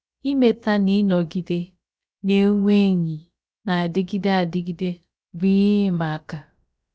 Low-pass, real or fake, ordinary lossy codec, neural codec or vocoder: none; fake; none; codec, 16 kHz, about 1 kbps, DyCAST, with the encoder's durations